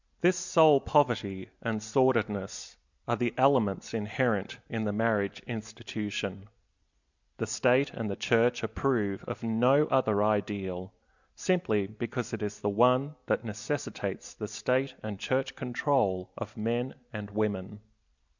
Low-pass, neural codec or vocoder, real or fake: 7.2 kHz; none; real